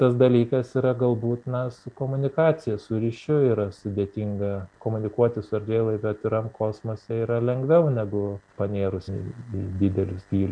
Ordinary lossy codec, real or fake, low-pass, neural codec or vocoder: MP3, 96 kbps; real; 9.9 kHz; none